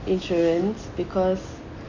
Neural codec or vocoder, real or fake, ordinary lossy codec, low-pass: none; real; none; 7.2 kHz